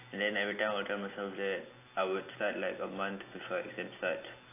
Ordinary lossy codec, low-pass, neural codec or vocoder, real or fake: AAC, 24 kbps; 3.6 kHz; none; real